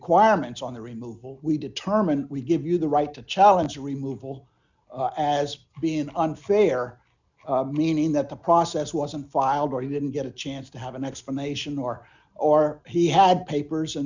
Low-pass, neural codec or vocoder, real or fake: 7.2 kHz; none; real